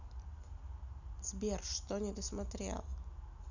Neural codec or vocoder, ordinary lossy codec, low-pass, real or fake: none; none; 7.2 kHz; real